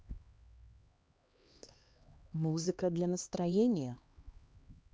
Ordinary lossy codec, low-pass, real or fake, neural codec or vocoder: none; none; fake; codec, 16 kHz, 2 kbps, X-Codec, HuBERT features, trained on LibriSpeech